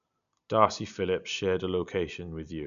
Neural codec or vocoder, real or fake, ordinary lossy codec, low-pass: none; real; none; 7.2 kHz